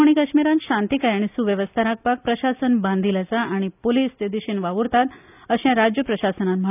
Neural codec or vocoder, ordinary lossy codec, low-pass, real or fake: none; none; 3.6 kHz; real